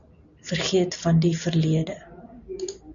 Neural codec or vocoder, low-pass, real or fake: none; 7.2 kHz; real